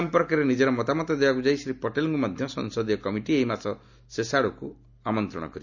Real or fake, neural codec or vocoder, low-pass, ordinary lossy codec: real; none; 7.2 kHz; none